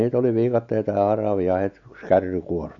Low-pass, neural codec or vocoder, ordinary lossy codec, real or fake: 7.2 kHz; none; AAC, 64 kbps; real